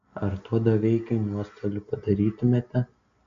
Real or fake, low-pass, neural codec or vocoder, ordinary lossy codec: real; 7.2 kHz; none; AAC, 64 kbps